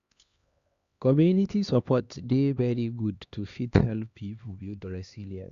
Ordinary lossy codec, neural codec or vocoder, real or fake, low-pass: Opus, 64 kbps; codec, 16 kHz, 2 kbps, X-Codec, HuBERT features, trained on LibriSpeech; fake; 7.2 kHz